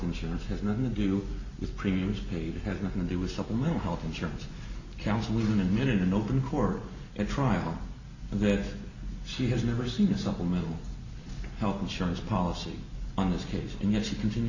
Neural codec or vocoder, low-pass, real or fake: none; 7.2 kHz; real